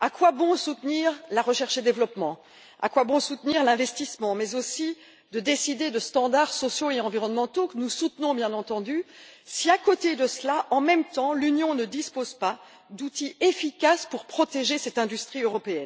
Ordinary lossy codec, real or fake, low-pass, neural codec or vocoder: none; real; none; none